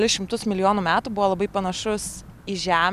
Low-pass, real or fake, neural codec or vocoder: 14.4 kHz; real; none